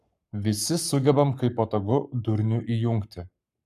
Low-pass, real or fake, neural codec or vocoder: 14.4 kHz; fake; codec, 44.1 kHz, 7.8 kbps, Pupu-Codec